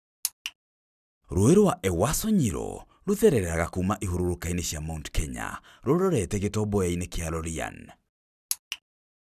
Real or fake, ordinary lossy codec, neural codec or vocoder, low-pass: real; none; none; 14.4 kHz